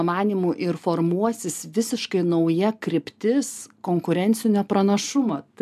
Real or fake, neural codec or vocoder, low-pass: real; none; 14.4 kHz